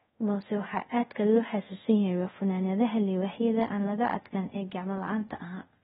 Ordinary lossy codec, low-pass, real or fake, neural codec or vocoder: AAC, 16 kbps; 10.8 kHz; fake; codec, 24 kHz, 0.5 kbps, DualCodec